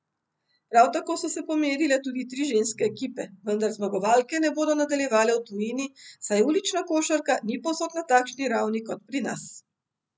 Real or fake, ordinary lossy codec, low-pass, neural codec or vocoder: real; none; none; none